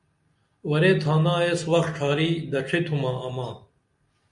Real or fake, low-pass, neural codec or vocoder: real; 10.8 kHz; none